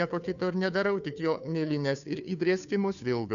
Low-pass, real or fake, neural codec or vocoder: 7.2 kHz; fake; codec, 16 kHz, 2 kbps, FunCodec, trained on Chinese and English, 25 frames a second